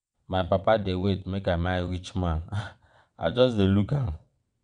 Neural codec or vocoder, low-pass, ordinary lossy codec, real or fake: vocoder, 22.05 kHz, 80 mel bands, WaveNeXt; 9.9 kHz; none; fake